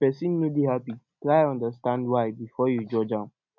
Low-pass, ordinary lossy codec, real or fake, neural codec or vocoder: 7.2 kHz; none; real; none